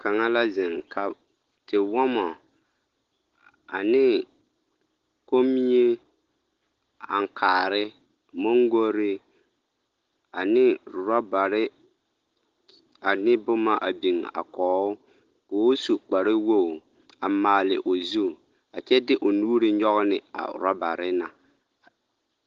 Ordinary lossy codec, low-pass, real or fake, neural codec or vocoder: Opus, 16 kbps; 7.2 kHz; real; none